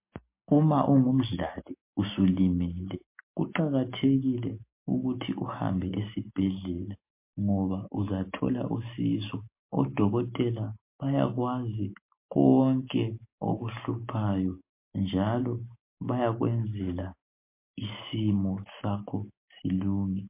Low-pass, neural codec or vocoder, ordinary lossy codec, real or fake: 3.6 kHz; none; MP3, 24 kbps; real